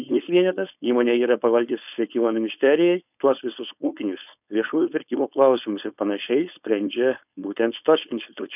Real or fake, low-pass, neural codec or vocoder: fake; 3.6 kHz; codec, 16 kHz, 4.8 kbps, FACodec